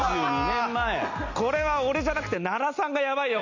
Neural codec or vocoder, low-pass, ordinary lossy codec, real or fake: none; 7.2 kHz; none; real